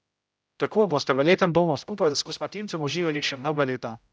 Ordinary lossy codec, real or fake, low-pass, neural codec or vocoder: none; fake; none; codec, 16 kHz, 0.5 kbps, X-Codec, HuBERT features, trained on general audio